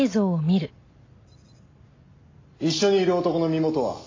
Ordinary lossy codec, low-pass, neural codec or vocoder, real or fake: AAC, 32 kbps; 7.2 kHz; none; real